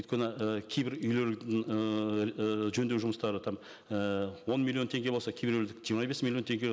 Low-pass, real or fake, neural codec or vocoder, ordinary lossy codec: none; real; none; none